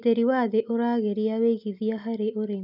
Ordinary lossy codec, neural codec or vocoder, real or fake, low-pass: none; none; real; 5.4 kHz